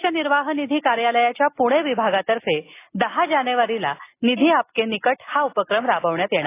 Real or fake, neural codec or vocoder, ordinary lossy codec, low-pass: real; none; AAC, 24 kbps; 3.6 kHz